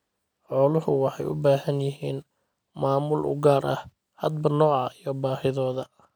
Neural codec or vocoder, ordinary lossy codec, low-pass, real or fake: none; none; none; real